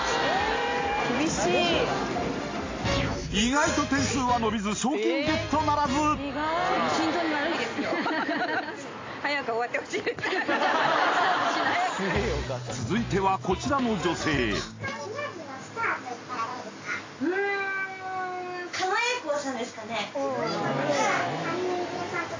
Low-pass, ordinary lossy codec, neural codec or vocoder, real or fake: 7.2 kHz; AAC, 32 kbps; none; real